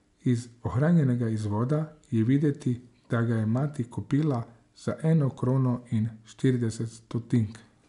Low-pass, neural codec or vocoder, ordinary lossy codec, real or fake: 10.8 kHz; none; none; real